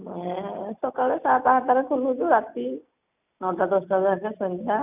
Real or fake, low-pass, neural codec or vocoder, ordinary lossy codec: real; 3.6 kHz; none; none